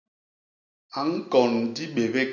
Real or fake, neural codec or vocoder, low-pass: real; none; 7.2 kHz